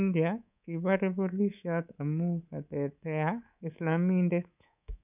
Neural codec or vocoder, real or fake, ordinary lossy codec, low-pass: codec, 24 kHz, 3.1 kbps, DualCodec; fake; none; 3.6 kHz